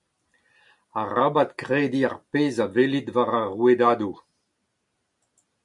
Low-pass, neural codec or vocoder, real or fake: 10.8 kHz; none; real